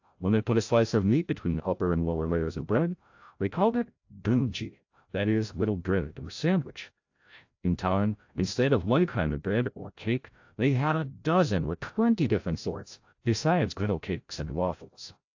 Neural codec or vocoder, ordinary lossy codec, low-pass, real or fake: codec, 16 kHz, 0.5 kbps, FreqCodec, larger model; AAC, 48 kbps; 7.2 kHz; fake